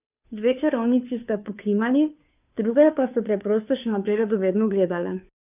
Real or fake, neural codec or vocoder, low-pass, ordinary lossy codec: fake; codec, 16 kHz, 2 kbps, FunCodec, trained on Chinese and English, 25 frames a second; 3.6 kHz; none